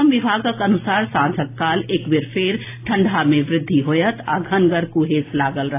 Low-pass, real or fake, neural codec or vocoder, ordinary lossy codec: 3.6 kHz; real; none; AAC, 24 kbps